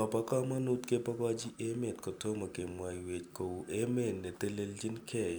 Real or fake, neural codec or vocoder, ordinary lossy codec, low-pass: real; none; none; none